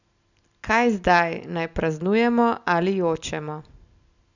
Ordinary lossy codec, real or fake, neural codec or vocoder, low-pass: none; real; none; 7.2 kHz